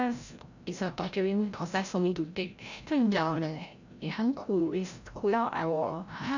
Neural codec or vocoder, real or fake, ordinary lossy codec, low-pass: codec, 16 kHz, 0.5 kbps, FreqCodec, larger model; fake; none; 7.2 kHz